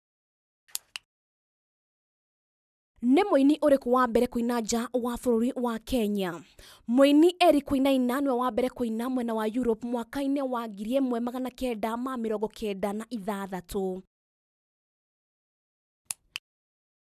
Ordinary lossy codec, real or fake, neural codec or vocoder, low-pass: none; real; none; 14.4 kHz